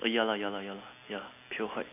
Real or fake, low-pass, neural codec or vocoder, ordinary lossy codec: real; 3.6 kHz; none; none